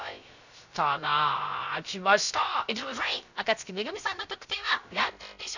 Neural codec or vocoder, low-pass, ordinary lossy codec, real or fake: codec, 16 kHz, 0.3 kbps, FocalCodec; 7.2 kHz; none; fake